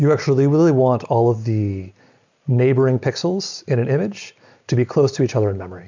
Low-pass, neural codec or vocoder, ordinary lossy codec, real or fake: 7.2 kHz; none; MP3, 64 kbps; real